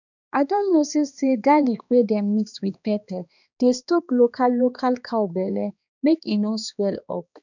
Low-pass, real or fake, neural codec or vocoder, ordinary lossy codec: 7.2 kHz; fake; codec, 16 kHz, 2 kbps, X-Codec, HuBERT features, trained on balanced general audio; none